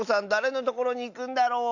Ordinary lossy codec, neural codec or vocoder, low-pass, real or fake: AAC, 48 kbps; none; 7.2 kHz; real